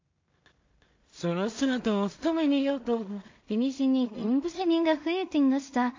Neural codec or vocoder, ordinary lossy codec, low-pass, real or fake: codec, 16 kHz in and 24 kHz out, 0.4 kbps, LongCat-Audio-Codec, two codebook decoder; MP3, 48 kbps; 7.2 kHz; fake